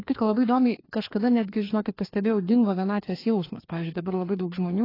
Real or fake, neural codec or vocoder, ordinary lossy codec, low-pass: fake; codec, 16 kHz, 2 kbps, FreqCodec, larger model; AAC, 24 kbps; 5.4 kHz